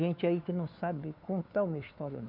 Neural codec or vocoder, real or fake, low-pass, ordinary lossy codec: codec, 16 kHz, 4 kbps, FunCodec, trained on LibriTTS, 50 frames a second; fake; 5.4 kHz; none